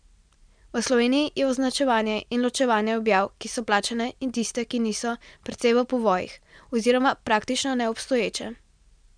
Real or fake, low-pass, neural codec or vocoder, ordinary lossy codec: real; 9.9 kHz; none; MP3, 96 kbps